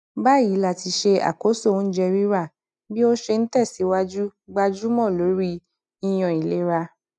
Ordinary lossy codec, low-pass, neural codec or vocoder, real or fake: none; 10.8 kHz; none; real